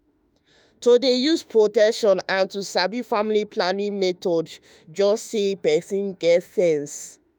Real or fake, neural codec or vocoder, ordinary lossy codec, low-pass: fake; autoencoder, 48 kHz, 32 numbers a frame, DAC-VAE, trained on Japanese speech; none; none